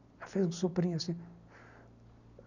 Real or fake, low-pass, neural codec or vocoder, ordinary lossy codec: real; 7.2 kHz; none; none